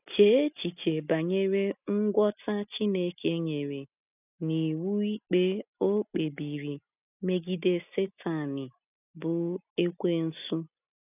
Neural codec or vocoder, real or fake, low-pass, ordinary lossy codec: none; real; 3.6 kHz; none